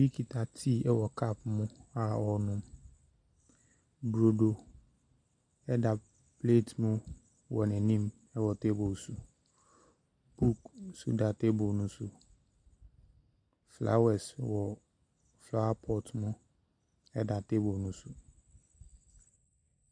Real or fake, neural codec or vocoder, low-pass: real; none; 9.9 kHz